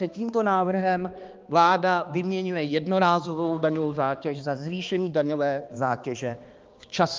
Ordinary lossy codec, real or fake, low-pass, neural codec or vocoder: Opus, 24 kbps; fake; 7.2 kHz; codec, 16 kHz, 2 kbps, X-Codec, HuBERT features, trained on balanced general audio